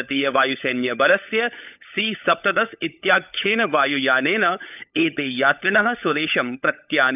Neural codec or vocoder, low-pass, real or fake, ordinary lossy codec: codec, 16 kHz, 16 kbps, FunCodec, trained on LibriTTS, 50 frames a second; 3.6 kHz; fake; none